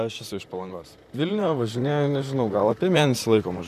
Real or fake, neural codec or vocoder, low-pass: fake; vocoder, 44.1 kHz, 128 mel bands, Pupu-Vocoder; 14.4 kHz